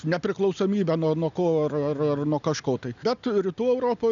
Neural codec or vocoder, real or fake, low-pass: none; real; 7.2 kHz